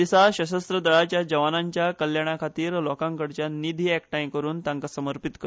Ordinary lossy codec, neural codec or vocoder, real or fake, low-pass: none; none; real; none